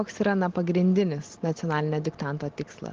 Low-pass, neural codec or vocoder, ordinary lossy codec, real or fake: 7.2 kHz; none; Opus, 16 kbps; real